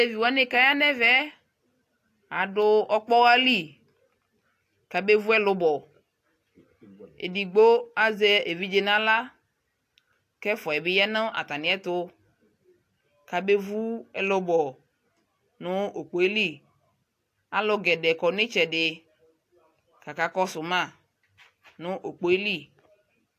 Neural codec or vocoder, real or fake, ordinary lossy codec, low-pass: none; real; AAC, 64 kbps; 14.4 kHz